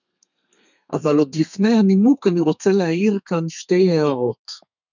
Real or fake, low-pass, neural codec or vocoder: fake; 7.2 kHz; codec, 32 kHz, 1.9 kbps, SNAC